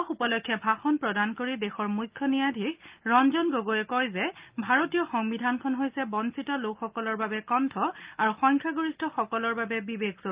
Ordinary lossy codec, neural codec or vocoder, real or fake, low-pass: Opus, 24 kbps; none; real; 3.6 kHz